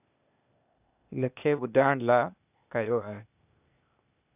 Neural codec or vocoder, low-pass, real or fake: codec, 16 kHz, 0.8 kbps, ZipCodec; 3.6 kHz; fake